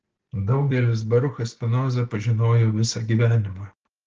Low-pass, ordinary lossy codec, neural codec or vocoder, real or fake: 7.2 kHz; Opus, 16 kbps; none; real